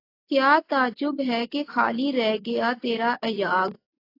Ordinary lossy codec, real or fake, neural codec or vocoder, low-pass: AAC, 32 kbps; real; none; 5.4 kHz